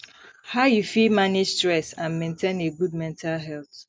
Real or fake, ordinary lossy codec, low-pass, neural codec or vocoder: real; none; none; none